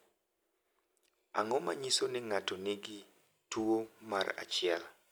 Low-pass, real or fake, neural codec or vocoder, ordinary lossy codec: none; real; none; none